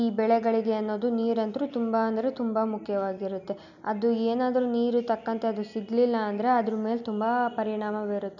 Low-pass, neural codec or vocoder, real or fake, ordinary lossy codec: 7.2 kHz; none; real; none